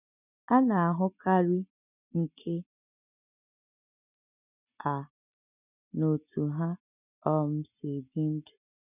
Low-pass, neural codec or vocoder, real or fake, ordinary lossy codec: 3.6 kHz; none; real; none